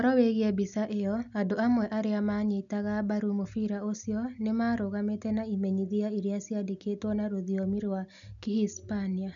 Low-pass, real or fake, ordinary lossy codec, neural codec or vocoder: 7.2 kHz; real; none; none